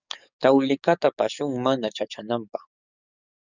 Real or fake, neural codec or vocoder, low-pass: fake; codec, 44.1 kHz, 7.8 kbps, DAC; 7.2 kHz